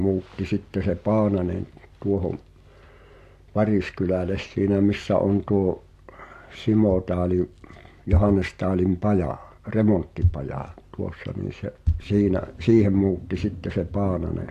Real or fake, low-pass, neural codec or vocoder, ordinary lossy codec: real; 14.4 kHz; none; AAC, 64 kbps